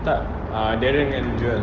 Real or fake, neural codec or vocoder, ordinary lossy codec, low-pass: real; none; Opus, 16 kbps; 7.2 kHz